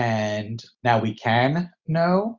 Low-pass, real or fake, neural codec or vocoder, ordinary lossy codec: 7.2 kHz; real; none; Opus, 64 kbps